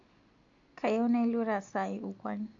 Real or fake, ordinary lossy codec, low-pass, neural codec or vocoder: real; AAC, 48 kbps; 7.2 kHz; none